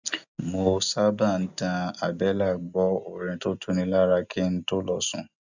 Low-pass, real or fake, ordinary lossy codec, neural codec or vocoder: 7.2 kHz; real; none; none